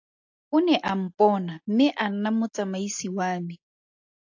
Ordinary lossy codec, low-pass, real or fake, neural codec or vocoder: AAC, 48 kbps; 7.2 kHz; real; none